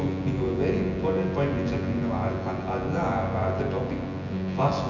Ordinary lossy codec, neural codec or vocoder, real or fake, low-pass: none; vocoder, 24 kHz, 100 mel bands, Vocos; fake; 7.2 kHz